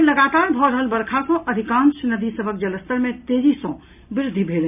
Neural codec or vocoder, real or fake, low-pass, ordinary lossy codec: none; real; 3.6 kHz; none